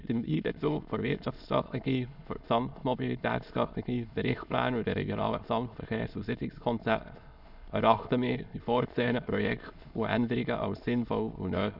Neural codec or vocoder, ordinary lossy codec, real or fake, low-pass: autoencoder, 22.05 kHz, a latent of 192 numbers a frame, VITS, trained on many speakers; none; fake; 5.4 kHz